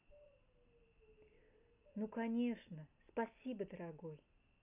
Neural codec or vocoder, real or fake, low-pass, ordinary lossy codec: none; real; 3.6 kHz; none